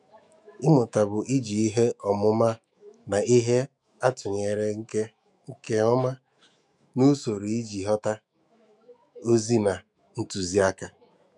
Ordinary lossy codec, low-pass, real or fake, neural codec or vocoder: none; 10.8 kHz; fake; autoencoder, 48 kHz, 128 numbers a frame, DAC-VAE, trained on Japanese speech